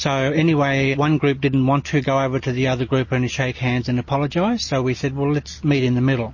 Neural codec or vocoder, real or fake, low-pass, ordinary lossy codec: none; real; 7.2 kHz; MP3, 32 kbps